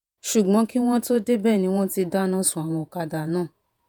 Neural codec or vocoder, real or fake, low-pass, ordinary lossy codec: vocoder, 48 kHz, 128 mel bands, Vocos; fake; none; none